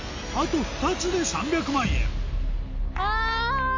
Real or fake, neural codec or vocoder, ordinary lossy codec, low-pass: real; none; MP3, 48 kbps; 7.2 kHz